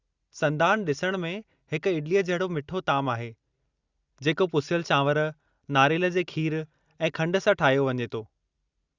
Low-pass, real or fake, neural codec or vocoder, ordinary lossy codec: 7.2 kHz; real; none; Opus, 64 kbps